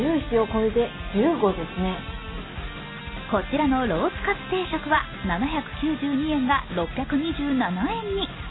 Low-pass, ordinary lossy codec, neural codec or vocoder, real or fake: 7.2 kHz; AAC, 16 kbps; none; real